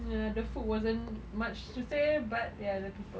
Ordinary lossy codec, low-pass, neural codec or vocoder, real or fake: none; none; none; real